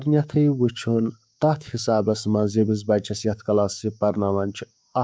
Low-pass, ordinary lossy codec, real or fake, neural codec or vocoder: none; none; fake; codec, 16 kHz, 6 kbps, DAC